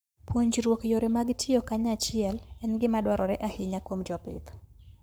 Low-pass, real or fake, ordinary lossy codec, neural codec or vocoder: none; fake; none; codec, 44.1 kHz, 7.8 kbps, Pupu-Codec